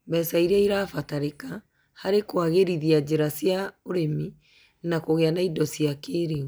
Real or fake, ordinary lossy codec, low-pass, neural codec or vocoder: real; none; none; none